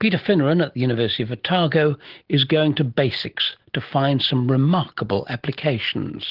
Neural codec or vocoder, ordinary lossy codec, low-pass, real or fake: none; Opus, 16 kbps; 5.4 kHz; real